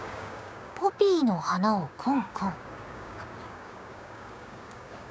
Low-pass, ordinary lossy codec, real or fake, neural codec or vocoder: none; none; fake; codec, 16 kHz, 6 kbps, DAC